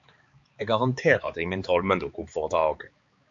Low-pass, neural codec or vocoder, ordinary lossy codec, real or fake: 7.2 kHz; codec, 16 kHz, 4 kbps, X-Codec, HuBERT features, trained on balanced general audio; MP3, 48 kbps; fake